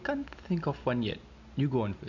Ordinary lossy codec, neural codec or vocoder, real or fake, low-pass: none; none; real; 7.2 kHz